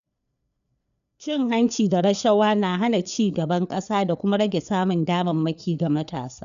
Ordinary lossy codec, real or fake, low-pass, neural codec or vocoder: none; fake; 7.2 kHz; codec, 16 kHz, 4 kbps, FreqCodec, larger model